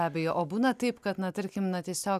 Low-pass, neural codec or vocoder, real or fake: 14.4 kHz; none; real